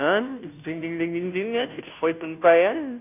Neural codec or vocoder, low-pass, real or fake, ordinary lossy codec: codec, 16 kHz, 0.5 kbps, FunCodec, trained on Chinese and English, 25 frames a second; 3.6 kHz; fake; none